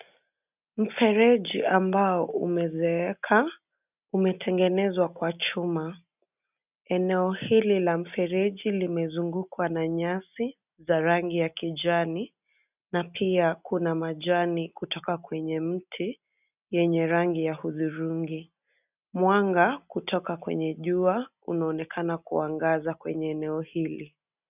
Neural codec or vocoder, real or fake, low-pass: none; real; 3.6 kHz